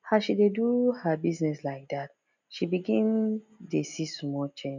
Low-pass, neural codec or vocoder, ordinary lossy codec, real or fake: 7.2 kHz; none; none; real